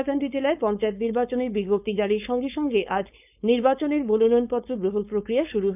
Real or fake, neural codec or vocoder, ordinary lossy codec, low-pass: fake; codec, 16 kHz, 4.8 kbps, FACodec; none; 3.6 kHz